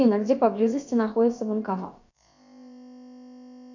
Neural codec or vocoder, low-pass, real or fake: codec, 16 kHz, about 1 kbps, DyCAST, with the encoder's durations; 7.2 kHz; fake